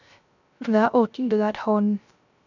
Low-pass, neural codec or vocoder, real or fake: 7.2 kHz; codec, 16 kHz, 0.3 kbps, FocalCodec; fake